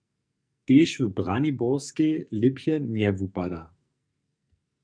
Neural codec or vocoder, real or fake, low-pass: codec, 44.1 kHz, 2.6 kbps, SNAC; fake; 9.9 kHz